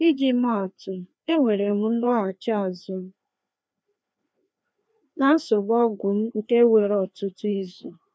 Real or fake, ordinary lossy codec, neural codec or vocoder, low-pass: fake; none; codec, 16 kHz, 2 kbps, FreqCodec, larger model; none